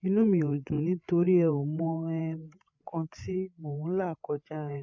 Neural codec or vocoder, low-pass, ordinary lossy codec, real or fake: codec, 16 kHz, 8 kbps, FreqCodec, larger model; 7.2 kHz; MP3, 48 kbps; fake